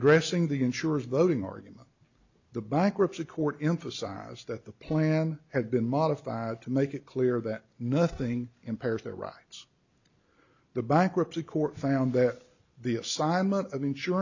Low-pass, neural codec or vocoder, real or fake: 7.2 kHz; none; real